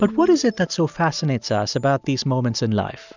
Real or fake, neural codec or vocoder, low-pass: real; none; 7.2 kHz